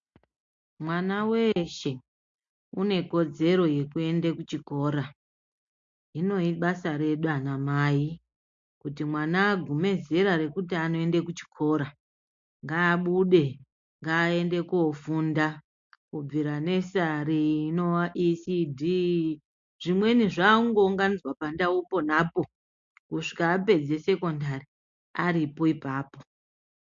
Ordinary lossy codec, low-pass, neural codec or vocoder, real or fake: MP3, 48 kbps; 7.2 kHz; none; real